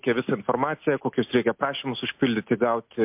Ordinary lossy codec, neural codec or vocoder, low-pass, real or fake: MP3, 32 kbps; none; 3.6 kHz; real